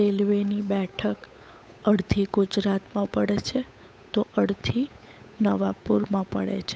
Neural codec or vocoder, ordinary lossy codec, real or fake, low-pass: codec, 16 kHz, 8 kbps, FunCodec, trained on Chinese and English, 25 frames a second; none; fake; none